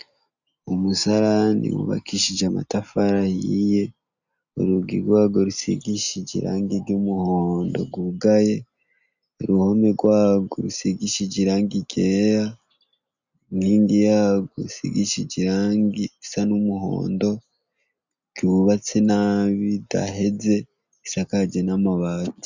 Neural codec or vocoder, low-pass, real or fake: none; 7.2 kHz; real